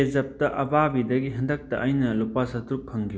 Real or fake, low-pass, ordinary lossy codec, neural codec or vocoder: real; none; none; none